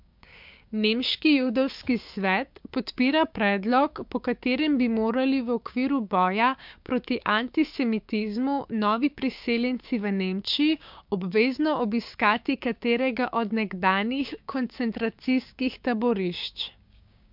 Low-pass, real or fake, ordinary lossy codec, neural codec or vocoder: 5.4 kHz; fake; MP3, 48 kbps; codec, 16 kHz, 6 kbps, DAC